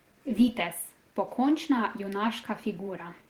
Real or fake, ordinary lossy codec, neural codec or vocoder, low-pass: fake; Opus, 16 kbps; vocoder, 44.1 kHz, 128 mel bands every 512 samples, BigVGAN v2; 19.8 kHz